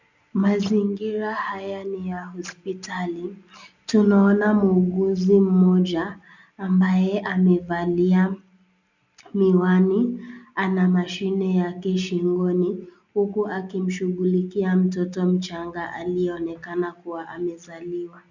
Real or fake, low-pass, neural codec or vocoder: real; 7.2 kHz; none